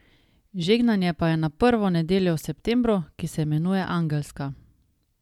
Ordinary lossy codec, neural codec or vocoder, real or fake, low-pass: MP3, 96 kbps; none; real; 19.8 kHz